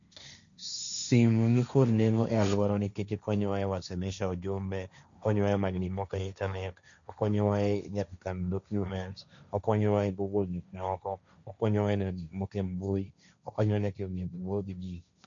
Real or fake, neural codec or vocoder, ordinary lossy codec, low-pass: fake; codec, 16 kHz, 1.1 kbps, Voila-Tokenizer; none; 7.2 kHz